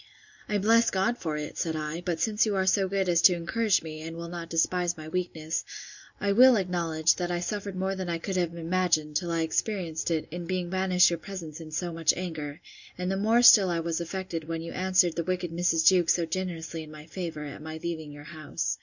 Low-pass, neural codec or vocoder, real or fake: 7.2 kHz; none; real